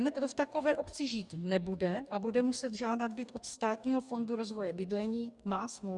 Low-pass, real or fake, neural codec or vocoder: 10.8 kHz; fake; codec, 44.1 kHz, 2.6 kbps, DAC